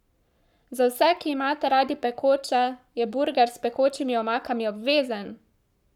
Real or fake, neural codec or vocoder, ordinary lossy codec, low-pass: fake; codec, 44.1 kHz, 7.8 kbps, Pupu-Codec; none; 19.8 kHz